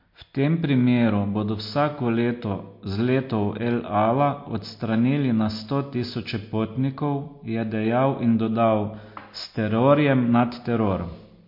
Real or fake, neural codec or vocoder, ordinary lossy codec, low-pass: real; none; MP3, 32 kbps; 5.4 kHz